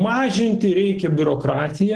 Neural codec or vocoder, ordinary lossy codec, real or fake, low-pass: none; Opus, 16 kbps; real; 10.8 kHz